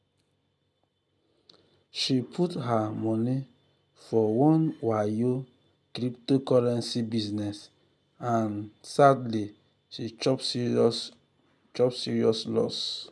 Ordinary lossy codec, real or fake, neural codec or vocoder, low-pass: none; real; none; none